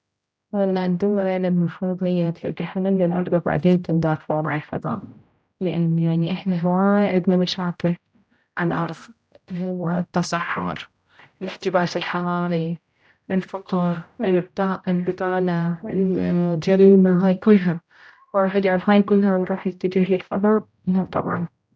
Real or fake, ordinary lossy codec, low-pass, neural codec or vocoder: fake; none; none; codec, 16 kHz, 0.5 kbps, X-Codec, HuBERT features, trained on general audio